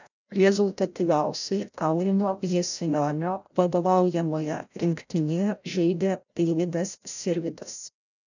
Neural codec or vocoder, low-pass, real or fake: codec, 16 kHz, 0.5 kbps, FreqCodec, larger model; 7.2 kHz; fake